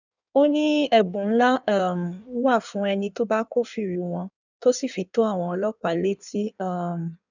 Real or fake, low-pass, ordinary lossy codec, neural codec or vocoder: fake; 7.2 kHz; none; codec, 16 kHz in and 24 kHz out, 1.1 kbps, FireRedTTS-2 codec